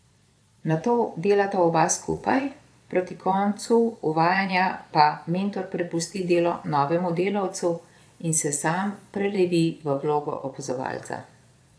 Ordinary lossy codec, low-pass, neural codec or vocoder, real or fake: none; none; vocoder, 22.05 kHz, 80 mel bands, Vocos; fake